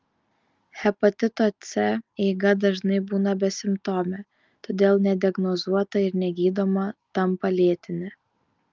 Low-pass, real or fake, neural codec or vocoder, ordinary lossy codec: 7.2 kHz; real; none; Opus, 32 kbps